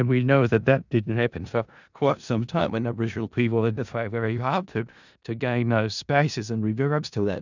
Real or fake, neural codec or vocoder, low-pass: fake; codec, 16 kHz in and 24 kHz out, 0.4 kbps, LongCat-Audio-Codec, four codebook decoder; 7.2 kHz